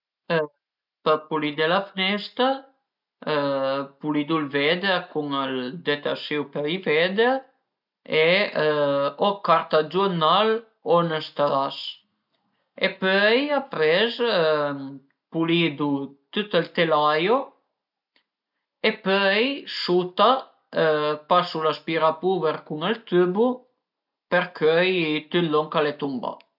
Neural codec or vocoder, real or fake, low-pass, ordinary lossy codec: none; real; 5.4 kHz; none